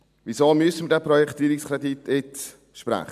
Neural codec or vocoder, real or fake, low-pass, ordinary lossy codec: none; real; 14.4 kHz; MP3, 96 kbps